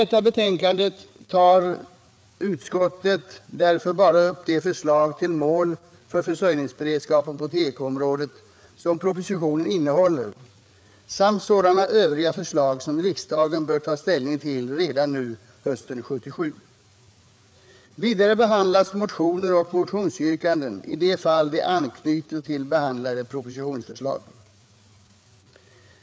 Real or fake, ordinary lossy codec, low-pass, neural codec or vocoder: fake; none; none; codec, 16 kHz, 4 kbps, FreqCodec, larger model